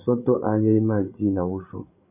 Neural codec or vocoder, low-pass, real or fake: codec, 16 kHz, 8 kbps, FreqCodec, larger model; 3.6 kHz; fake